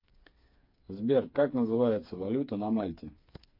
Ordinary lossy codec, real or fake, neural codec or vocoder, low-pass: MP3, 32 kbps; fake; codec, 16 kHz, 4 kbps, FreqCodec, smaller model; 5.4 kHz